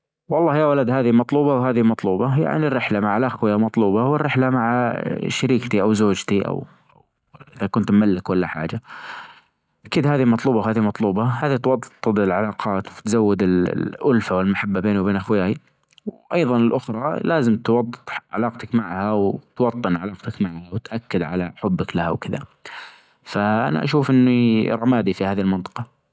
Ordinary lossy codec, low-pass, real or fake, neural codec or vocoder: none; none; real; none